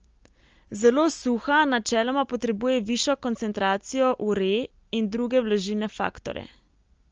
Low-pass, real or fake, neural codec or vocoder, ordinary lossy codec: 7.2 kHz; real; none; Opus, 24 kbps